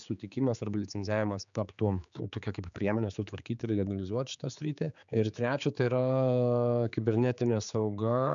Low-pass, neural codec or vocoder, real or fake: 7.2 kHz; codec, 16 kHz, 4 kbps, X-Codec, HuBERT features, trained on general audio; fake